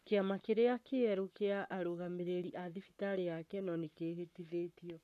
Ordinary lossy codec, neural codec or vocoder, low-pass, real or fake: none; codec, 44.1 kHz, 7.8 kbps, Pupu-Codec; 14.4 kHz; fake